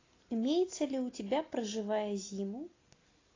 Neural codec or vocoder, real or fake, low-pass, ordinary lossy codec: none; real; 7.2 kHz; AAC, 32 kbps